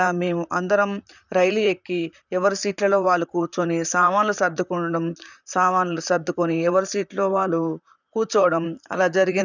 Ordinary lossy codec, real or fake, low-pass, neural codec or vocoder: none; fake; 7.2 kHz; vocoder, 44.1 kHz, 128 mel bands, Pupu-Vocoder